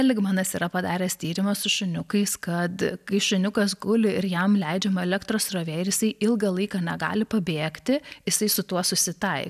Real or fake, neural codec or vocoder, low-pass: real; none; 14.4 kHz